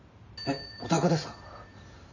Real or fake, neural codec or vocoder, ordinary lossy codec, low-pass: fake; vocoder, 44.1 kHz, 128 mel bands every 512 samples, BigVGAN v2; none; 7.2 kHz